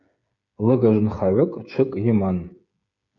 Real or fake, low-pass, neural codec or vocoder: fake; 7.2 kHz; codec, 16 kHz, 8 kbps, FreqCodec, smaller model